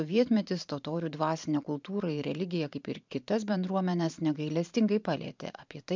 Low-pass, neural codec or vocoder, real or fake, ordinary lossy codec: 7.2 kHz; vocoder, 44.1 kHz, 128 mel bands every 512 samples, BigVGAN v2; fake; MP3, 64 kbps